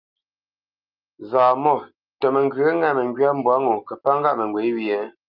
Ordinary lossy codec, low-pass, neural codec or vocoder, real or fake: Opus, 24 kbps; 5.4 kHz; none; real